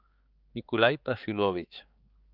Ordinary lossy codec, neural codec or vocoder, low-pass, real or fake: Opus, 32 kbps; codec, 16 kHz, 4 kbps, X-Codec, HuBERT features, trained on balanced general audio; 5.4 kHz; fake